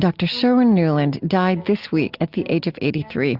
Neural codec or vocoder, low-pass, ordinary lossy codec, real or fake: none; 5.4 kHz; Opus, 32 kbps; real